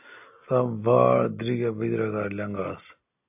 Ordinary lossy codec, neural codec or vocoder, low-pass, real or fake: AAC, 32 kbps; none; 3.6 kHz; real